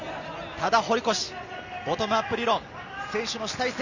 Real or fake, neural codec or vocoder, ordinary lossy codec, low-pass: real; none; Opus, 64 kbps; 7.2 kHz